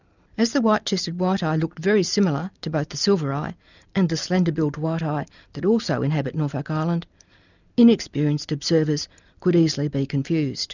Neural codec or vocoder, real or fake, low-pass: none; real; 7.2 kHz